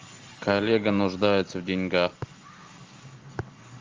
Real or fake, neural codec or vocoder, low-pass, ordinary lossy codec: real; none; 7.2 kHz; Opus, 24 kbps